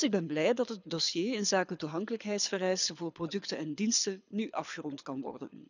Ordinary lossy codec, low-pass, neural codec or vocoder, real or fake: none; 7.2 kHz; codec, 24 kHz, 6 kbps, HILCodec; fake